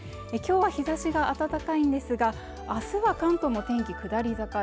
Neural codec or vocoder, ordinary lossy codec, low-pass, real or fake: none; none; none; real